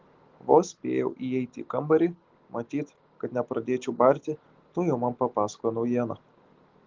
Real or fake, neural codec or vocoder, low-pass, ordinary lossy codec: real; none; 7.2 kHz; Opus, 16 kbps